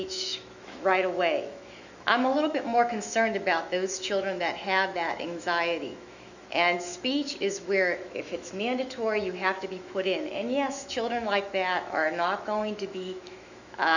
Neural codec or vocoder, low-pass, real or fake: autoencoder, 48 kHz, 128 numbers a frame, DAC-VAE, trained on Japanese speech; 7.2 kHz; fake